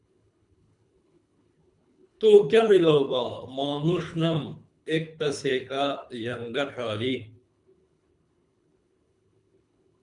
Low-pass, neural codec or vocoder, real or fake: 10.8 kHz; codec, 24 kHz, 3 kbps, HILCodec; fake